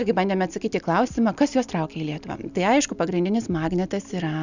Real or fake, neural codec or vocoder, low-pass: real; none; 7.2 kHz